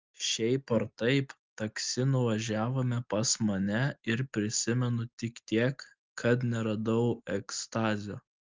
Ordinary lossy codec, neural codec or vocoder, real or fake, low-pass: Opus, 32 kbps; none; real; 7.2 kHz